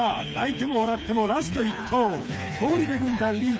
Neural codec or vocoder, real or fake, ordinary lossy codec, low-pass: codec, 16 kHz, 4 kbps, FreqCodec, smaller model; fake; none; none